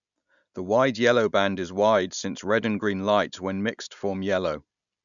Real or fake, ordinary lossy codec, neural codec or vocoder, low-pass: real; none; none; 7.2 kHz